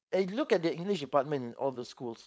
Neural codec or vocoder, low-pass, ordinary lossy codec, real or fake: codec, 16 kHz, 4.8 kbps, FACodec; none; none; fake